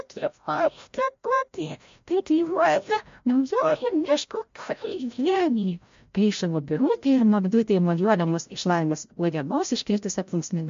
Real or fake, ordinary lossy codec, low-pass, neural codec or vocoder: fake; MP3, 48 kbps; 7.2 kHz; codec, 16 kHz, 0.5 kbps, FreqCodec, larger model